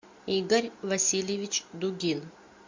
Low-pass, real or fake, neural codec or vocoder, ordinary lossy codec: 7.2 kHz; real; none; MP3, 48 kbps